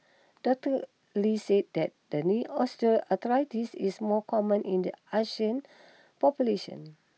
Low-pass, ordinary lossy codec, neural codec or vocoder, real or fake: none; none; none; real